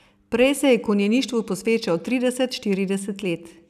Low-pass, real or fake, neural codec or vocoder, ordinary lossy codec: 14.4 kHz; real; none; none